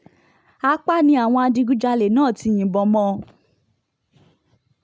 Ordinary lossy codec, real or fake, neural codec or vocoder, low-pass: none; real; none; none